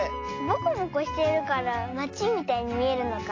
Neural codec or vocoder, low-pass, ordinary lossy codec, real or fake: none; 7.2 kHz; AAC, 32 kbps; real